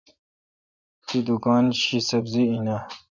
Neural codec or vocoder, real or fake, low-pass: vocoder, 24 kHz, 100 mel bands, Vocos; fake; 7.2 kHz